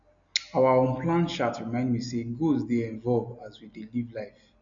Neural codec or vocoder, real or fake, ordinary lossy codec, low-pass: none; real; none; 7.2 kHz